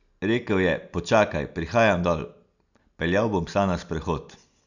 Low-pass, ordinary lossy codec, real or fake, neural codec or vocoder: 7.2 kHz; none; real; none